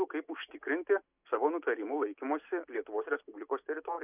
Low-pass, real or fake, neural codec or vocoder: 3.6 kHz; real; none